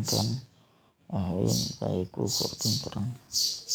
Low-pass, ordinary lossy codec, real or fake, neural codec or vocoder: none; none; fake; codec, 44.1 kHz, 7.8 kbps, DAC